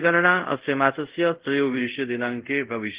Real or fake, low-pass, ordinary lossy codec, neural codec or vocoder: fake; 3.6 kHz; Opus, 16 kbps; codec, 24 kHz, 0.5 kbps, DualCodec